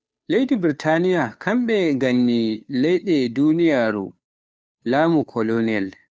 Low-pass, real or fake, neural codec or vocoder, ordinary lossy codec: none; fake; codec, 16 kHz, 2 kbps, FunCodec, trained on Chinese and English, 25 frames a second; none